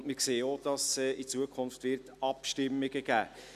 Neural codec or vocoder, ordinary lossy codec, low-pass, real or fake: none; none; 14.4 kHz; real